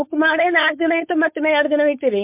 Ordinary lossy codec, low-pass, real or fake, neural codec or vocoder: MP3, 32 kbps; 3.6 kHz; fake; codec, 16 kHz, 4.8 kbps, FACodec